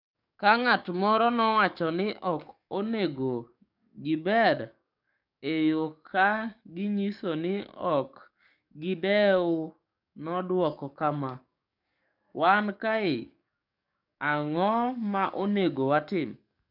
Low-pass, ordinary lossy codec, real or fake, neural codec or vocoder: 5.4 kHz; none; fake; codec, 44.1 kHz, 7.8 kbps, DAC